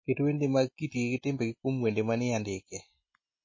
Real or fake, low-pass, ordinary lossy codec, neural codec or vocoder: real; 7.2 kHz; MP3, 32 kbps; none